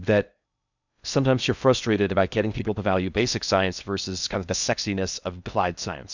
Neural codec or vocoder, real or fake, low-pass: codec, 16 kHz in and 24 kHz out, 0.6 kbps, FocalCodec, streaming, 2048 codes; fake; 7.2 kHz